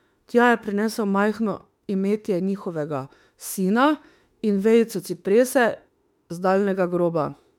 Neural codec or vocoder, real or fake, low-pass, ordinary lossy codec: autoencoder, 48 kHz, 32 numbers a frame, DAC-VAE, trained on Japanese speech; fake; 19.8 kHz; MP3, 96 kbps